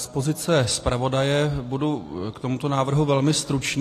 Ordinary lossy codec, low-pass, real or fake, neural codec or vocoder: AAC, 48 kbps; 14.4 kHz; real; none